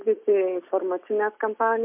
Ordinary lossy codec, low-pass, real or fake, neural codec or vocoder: MP3, 32 kbps; 3.6 kHz; real; none